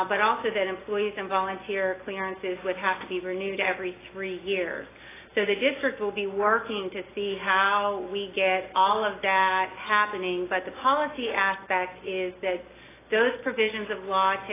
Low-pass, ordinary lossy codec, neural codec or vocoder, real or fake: 3.6 kHz; AAC, 16 kbps; none; real